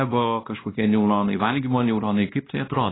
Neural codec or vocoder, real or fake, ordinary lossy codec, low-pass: codec, 16 kHz, 1 kbps, X-Codec, WavLM features, trained on Multilingual LibriSpeech; fake; AAC, 16 kbps; 7.2 kHz